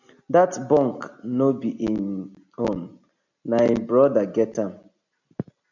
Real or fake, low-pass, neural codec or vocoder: real; 7.2 kHz; none